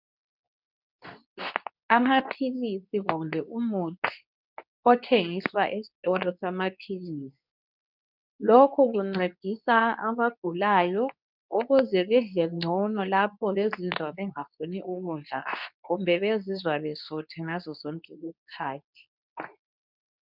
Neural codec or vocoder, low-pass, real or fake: codec, 24 kHz, 0.9 kbps, WavTokenizer, medium speech release version 2; 5.4 kHz; fake